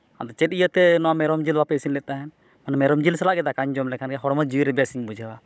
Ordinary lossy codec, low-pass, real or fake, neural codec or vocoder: none; none; fake; codec, 16 kHz, 16 kbps, FunCodec, trained on Chinese and English, 50 frames a second